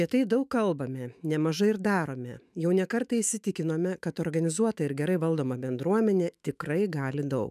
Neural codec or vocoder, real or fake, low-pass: autoencoder, 48 kHz, 128 numbers a frame, DAC-VAE, trained on Japanese speech; fake; 14.4 kHz